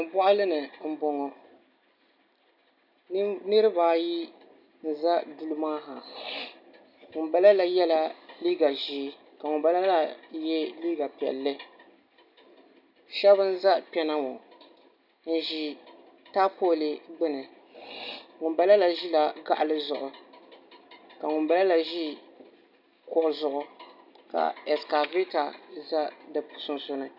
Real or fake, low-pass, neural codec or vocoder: real; 5.4 kHz; none